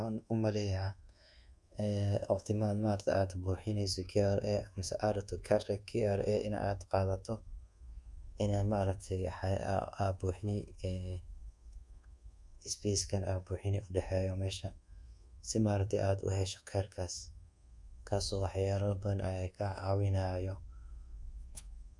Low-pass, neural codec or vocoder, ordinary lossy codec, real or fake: none; codec, 24 kHz, 1.2 kbps, DualCodec; none; fake